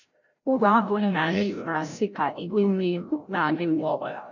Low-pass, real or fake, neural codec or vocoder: 7.2 kHz; fake; codec, 16 kHz, 0.5 kbps, FreqCodec, larger model